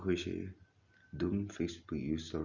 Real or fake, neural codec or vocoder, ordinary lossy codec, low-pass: real; none; none; 7.2 kHz